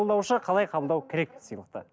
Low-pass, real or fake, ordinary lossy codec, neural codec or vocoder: none; real; none; none